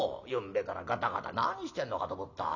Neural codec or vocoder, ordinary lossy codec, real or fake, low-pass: none; none; real; 7.2 kHz